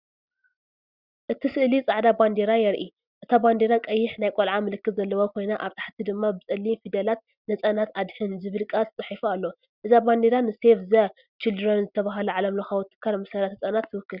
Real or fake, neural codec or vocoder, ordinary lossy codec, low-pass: real; none; Opus, 64 kbps; 5.4 kHz